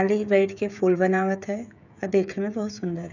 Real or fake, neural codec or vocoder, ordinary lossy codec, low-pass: fake; codec, 16 kHz, 16 kbps, FreqCodec, smaller model; none; 7.2 kHz